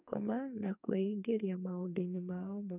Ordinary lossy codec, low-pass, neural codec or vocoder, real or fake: none; 3.6 kHz; codec, 44.1 kHz, 2.6 kbps, SNAC; fake